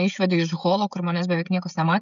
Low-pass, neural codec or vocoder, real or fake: 7.2 kHz; codec, 16 kHz, 16 kbps, FreqCodec, smaller model; fake